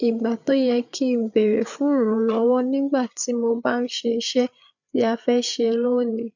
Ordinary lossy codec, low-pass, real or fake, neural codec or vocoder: none; 7.2 kHz; fake; codec, 16 kHz, 8 kbps, FreqCodec, larger model